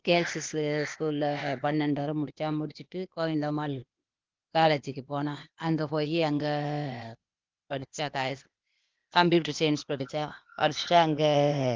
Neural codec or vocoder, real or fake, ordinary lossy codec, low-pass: codec, 16 kHz, 0.8 kbps, ZipCodec; fake; Opus, 32 kbps; 7.2 kHz